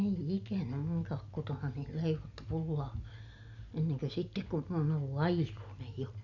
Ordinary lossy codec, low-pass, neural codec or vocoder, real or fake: none; 7.2 kHz; none; real